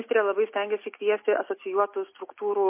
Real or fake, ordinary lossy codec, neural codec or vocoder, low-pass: real; MP3, 24 kbps; none; 3.6 kHz